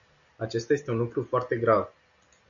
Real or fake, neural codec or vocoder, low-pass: real; none; 7.2 kHz